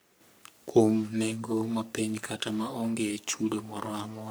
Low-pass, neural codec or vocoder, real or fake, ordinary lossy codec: none; codec, 44.1 kHz, 3.4 kbps, Pupu-Codec; fake; none